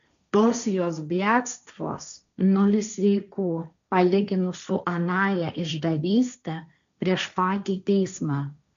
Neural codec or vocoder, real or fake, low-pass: codec, 16 kHz, 1.1 kbps, Voila-Tokenizer; fake; 7.2 kHz